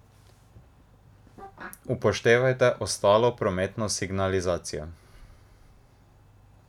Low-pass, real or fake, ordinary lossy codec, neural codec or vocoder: 19.8 kHz; real; none; none